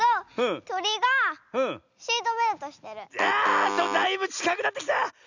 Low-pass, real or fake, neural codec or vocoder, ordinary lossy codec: 7.2 kHz; real; none; none